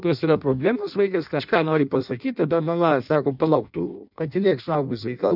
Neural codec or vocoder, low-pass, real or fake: codec, 16 kHz in and 24 kHz out, 0.6 kbps, FireRedTTS-2 codec; 5.4 kHz; fake